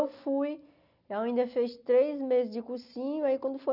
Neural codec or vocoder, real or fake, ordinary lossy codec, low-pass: none; real; none; 5.4 kHz